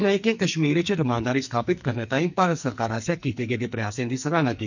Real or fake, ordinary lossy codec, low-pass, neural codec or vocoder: fake; none; 7.2 kHz; codec, 44.1 kHz, 2.6 kbps, SNAC